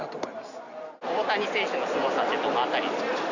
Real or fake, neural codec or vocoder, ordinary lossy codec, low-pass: real; none; none; 7.2 kHz